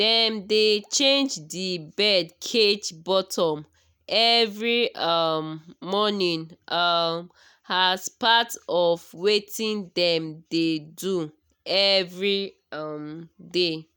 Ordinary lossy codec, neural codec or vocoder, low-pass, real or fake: none; none; none; real